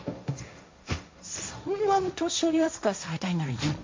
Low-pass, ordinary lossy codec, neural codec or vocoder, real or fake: 7.2 kHz; MP3, 48 kbps; codec, 16 kHz, 1.1 kbps, Voila-Tokenizer; fake